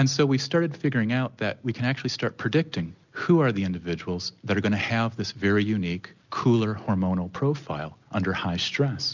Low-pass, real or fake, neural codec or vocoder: 7.2 kHz; real; none